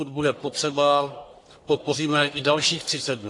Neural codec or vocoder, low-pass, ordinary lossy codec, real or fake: codec, 44.1 kHz, 1.7 kbps, Pupu-Codec; 10.8 kHz; AAC, 48 kbps; fake